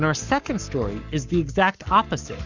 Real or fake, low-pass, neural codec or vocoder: fake; 7.2 kHz; codec, 44.1 kHz, 7.8 kbps, Pupu-Codec